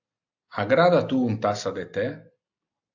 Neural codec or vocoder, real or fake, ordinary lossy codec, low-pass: none; real; AAC, 48 kbps; 7.2 kHz